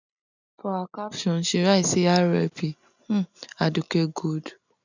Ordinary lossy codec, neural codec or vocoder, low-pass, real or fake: none; none; 7.2 kHz; real